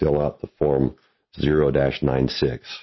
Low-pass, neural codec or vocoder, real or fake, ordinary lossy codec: 7.2 kHz; none; real; MP3, 24 kbps